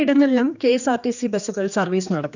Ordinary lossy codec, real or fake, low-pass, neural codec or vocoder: none; fake; 7.2 kHz; codec, 16 kHz, 2 kbps, X-Codec, HuBERT features, trained on general audio